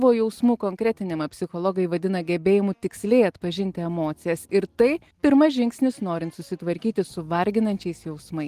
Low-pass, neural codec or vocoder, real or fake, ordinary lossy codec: 14.4 kHz; none; real; Opus, 24 kbps